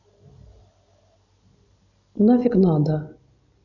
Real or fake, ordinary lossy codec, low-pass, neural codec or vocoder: real; none; 7.2 kHz; none